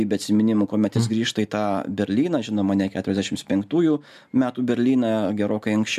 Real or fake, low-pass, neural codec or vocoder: real; 14.4 kHz; none